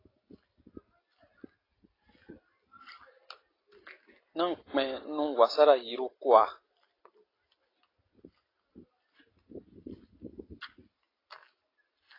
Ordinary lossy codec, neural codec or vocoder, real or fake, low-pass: AAC, 24 kbps; none; real; 5.4 kHz